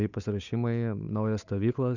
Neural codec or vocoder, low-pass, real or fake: codec, 16 kHz, 8 kbps, FunCodec, trained on Chinese and English, 25 frames a second; 7.2 kHz; fake